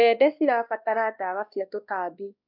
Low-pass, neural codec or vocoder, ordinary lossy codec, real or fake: 5.4 kHz; codec, 16 kHz, 1 kbps, X-Codec, WavLM features, trained on Multilingual LibriSpeech; none; fake